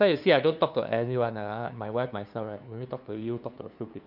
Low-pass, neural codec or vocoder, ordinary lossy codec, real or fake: 5.4 kHz; codec, 16 kHz, 2 kbps, FunCodec, trained on LibriTTS, 25 frames a second; none; fake